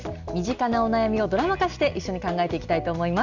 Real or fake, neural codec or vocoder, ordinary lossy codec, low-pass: real; none; none; 7.2 kHz